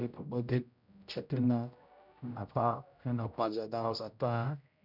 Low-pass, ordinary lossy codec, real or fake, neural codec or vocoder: 5.4 kHz; none; fake; codec, 16 kHz, 0.5 kbps, X-Codec, HuBERT features, trained on general audio